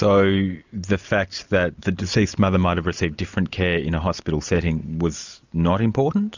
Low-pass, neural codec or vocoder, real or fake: 7.2 kHz; none; real